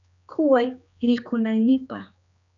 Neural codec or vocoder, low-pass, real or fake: codec, 16 kHz, 2 kbps, X-Codec, HuBERT features, trained on general audio; 7.2 kHz; fake